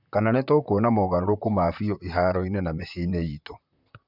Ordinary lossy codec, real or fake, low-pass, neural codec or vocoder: none; fake; 5.4 kHz; vocoder, 44.1 kHz, 128 mel bands, Pupu-Vocoder